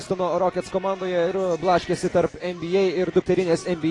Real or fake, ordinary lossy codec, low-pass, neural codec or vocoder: real; AAC, 32 kbps; 10.8 kHz; none